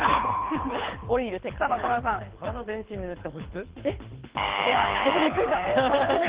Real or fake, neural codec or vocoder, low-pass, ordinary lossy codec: fake; codec, 24 kHz, 6 kbps, HILCodec; 3.6 kHz; Opus, 16 kbps